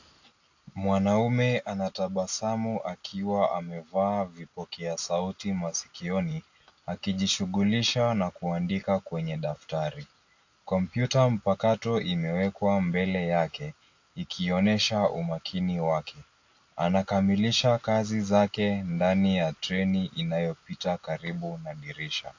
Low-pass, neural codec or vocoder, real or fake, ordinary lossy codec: 7.2 kHz; none; real; AAC, 48 kbps